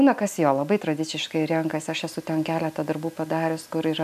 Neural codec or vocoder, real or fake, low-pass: none; real; 14.4 kHz